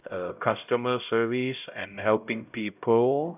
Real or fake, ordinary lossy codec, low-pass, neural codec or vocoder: fake; none; 3.6 kHz; codec, 16 kHz, 0.5 kbps, X-Codec, HuBERT features, trained on LibriSpeech